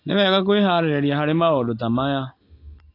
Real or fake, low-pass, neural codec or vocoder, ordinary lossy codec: real; 5.4 kHz; none; AAC, 32 kbps